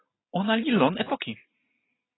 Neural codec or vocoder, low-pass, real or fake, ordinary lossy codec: none; 7.2 kHz; real; AAC, 16 kbps